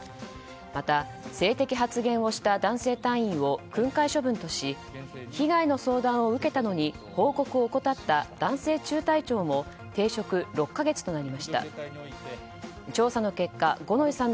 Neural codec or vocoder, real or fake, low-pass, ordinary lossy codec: none; real; none; none